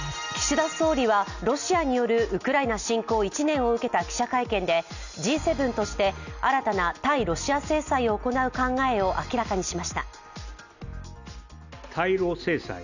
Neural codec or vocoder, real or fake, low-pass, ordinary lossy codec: none; real; 7.2 kHz; none